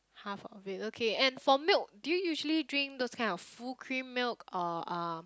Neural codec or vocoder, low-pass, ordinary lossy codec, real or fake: none; none; none; real